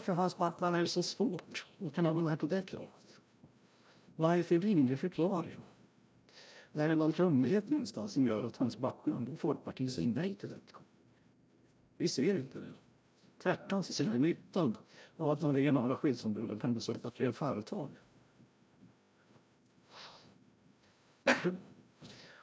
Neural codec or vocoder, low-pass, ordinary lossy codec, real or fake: codec, 16 kHz, 0.5 kbps, FreqCodec, larger model; none; none; fake